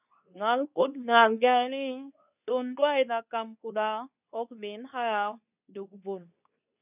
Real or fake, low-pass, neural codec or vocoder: fake; 3.6 kHz; codec, 24 kHz, 0.9 kbps, WavTokenizer, medium speech release version 2